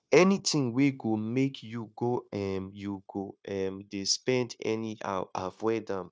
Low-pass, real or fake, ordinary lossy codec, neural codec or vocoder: none; fake; none; codec, 16 kHz, 0.9 kbps, LongCat-Audio-Codec